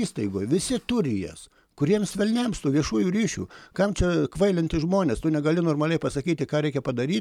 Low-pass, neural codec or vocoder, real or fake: 19.8 kHz; none; real